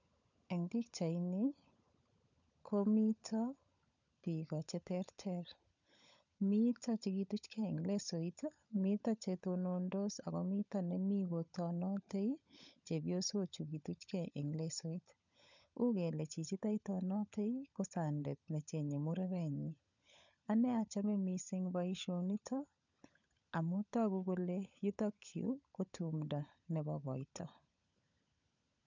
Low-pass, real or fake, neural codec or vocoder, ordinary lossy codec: 7.2 kHz; fake; codec, 16 kHz, 16 kbps, FunCodec, trained on LibriTTS, 50 frames a second; none